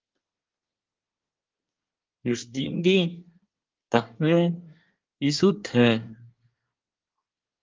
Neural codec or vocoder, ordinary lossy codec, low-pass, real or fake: codec, 24 kHz, 1 kbps, SNAC; Opus, 24 kbps; 7.2 kHz; fake